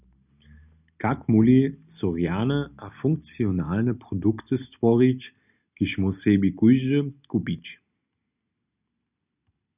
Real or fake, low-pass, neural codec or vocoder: real; 3.6 kHz; none